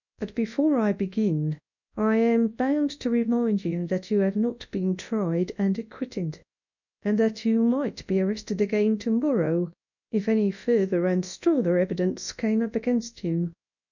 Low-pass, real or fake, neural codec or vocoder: 7.2 kHz; fake; codec, 24 kHz, 0.9 kbps, WavTokenizer, large speech release